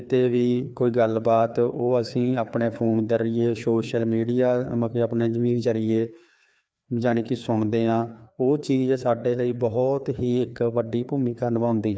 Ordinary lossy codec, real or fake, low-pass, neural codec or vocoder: none; fake; none; codec, 16 kHz, 2 kbps, FreqCodec, larger model